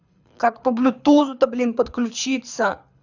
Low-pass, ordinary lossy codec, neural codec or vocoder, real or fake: 7.2 kHz; none; codec, 24 kHz, 6 kbps, HILCodec; fake